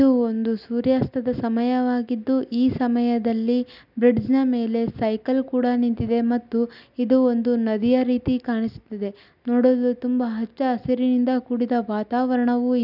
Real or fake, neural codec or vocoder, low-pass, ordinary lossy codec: real; none; 5.4 kHz; none